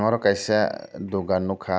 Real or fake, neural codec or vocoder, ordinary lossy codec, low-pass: real; none; none; none